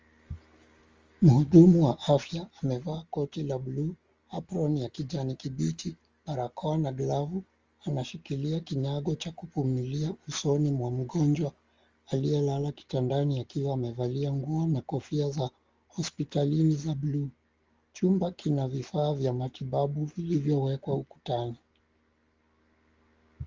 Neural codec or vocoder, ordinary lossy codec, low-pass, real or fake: none; Opus, 32 kbps; 7.2 kHz; real